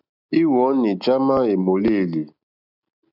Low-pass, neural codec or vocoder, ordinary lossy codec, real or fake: 5.4 kHz; none; AAC, 48 kbps; real